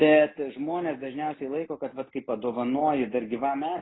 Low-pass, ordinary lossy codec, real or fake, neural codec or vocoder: 7.2 kHz; AAC, 16 kbps; real; none